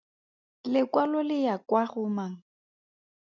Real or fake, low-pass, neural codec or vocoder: real; 7.2 kHz; none